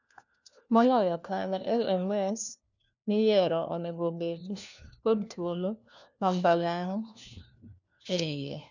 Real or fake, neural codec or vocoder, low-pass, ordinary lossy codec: fake; codec, 16 kHz, 1 kbps, FunCodec, trained on LibriTTS, 50 frames a second; 7.2 kHz; none